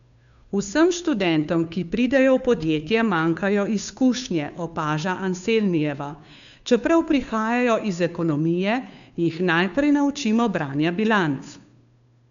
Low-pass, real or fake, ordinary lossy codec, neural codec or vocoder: 7.2 kHz; fake; none; codec, 16 kHz, 2 kbps, FunCodec, trained on Chinese and English, 25 frames a second